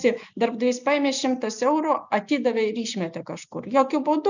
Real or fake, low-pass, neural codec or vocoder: real; 7.2 kHz; none